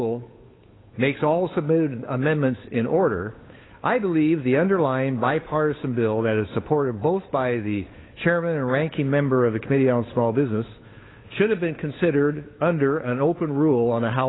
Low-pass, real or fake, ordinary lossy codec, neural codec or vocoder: 7.2 kHz; fake; AAC, 16 kbps; codec, 16 kHz, 8 kbps, FunCodec, trained on Chinese and English, 25 frames a second